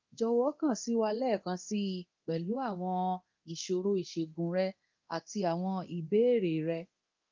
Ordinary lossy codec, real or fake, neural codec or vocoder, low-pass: Opus, 32 kbps; fake; codec, 24 kHz, 0.9 kbps, DualCodec; 7.2 kHz